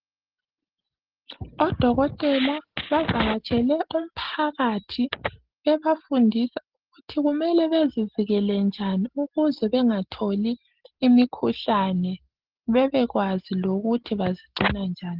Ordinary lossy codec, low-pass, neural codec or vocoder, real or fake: Opus, 16 kbps; 5.4 kHz; none; real